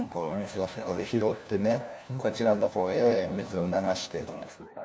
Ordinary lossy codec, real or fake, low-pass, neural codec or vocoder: none; fake; none; codec, 16 kHz, 1 kbps, FunCodec, trained on LibriTTS, 50 frames a second